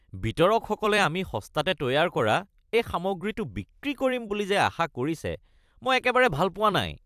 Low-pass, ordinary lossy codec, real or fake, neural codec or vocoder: 14.4 kHz; AAC, 96 kbps; fake; vocoder, 44.1 kHz, 128 mel bands every 256 samples, BigVGAN v2